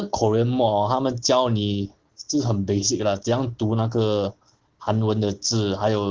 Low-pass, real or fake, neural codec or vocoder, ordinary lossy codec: 7.2 kHz; real; none; Opus, 16 kbps